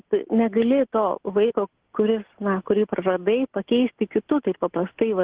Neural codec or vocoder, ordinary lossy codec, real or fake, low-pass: none; Opus, 16 kbps; real; 3.6 kHz